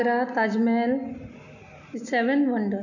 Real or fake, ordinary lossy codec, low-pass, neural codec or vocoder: real; none; 7.2 kHz; none